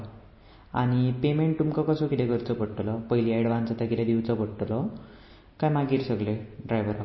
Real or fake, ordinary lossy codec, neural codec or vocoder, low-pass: real; MP3, 24 kbps; none; 7.2 kHz